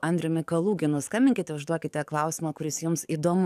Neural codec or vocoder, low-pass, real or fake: codec, 44.1 kHz, 7.8 kbps, DAC; 14.4 kHz; fake